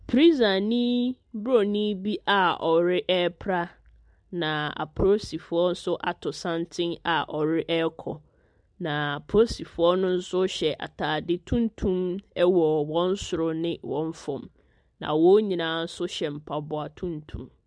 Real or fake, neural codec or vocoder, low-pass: real; none; 9.9 kHz